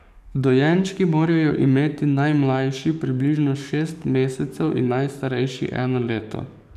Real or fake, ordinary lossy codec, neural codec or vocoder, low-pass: fake; none; codec, 44.1 kHz, 7.8 kbps, DAC; 14.4 kHz